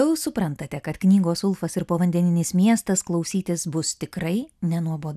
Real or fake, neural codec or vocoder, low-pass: real; none; 14.4 kHz